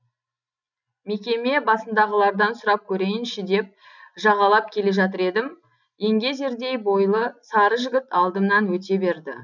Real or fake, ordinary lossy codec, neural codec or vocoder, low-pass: real; none; none; 7.2 kHz